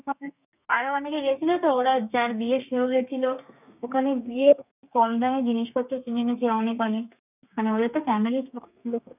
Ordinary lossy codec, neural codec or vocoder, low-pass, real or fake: none; codec, 32 kHz, 1.9 kbps, SNAC; 3.6 kHz; fake